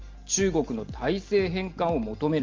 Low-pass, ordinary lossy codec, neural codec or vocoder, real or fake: 7.2 kHz; Opus, 32 kbps; none; real